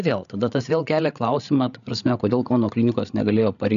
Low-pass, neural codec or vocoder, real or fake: 7.2 kHz; codec, 16 kHz, 8 kbps, FreqCodec, larger model; fake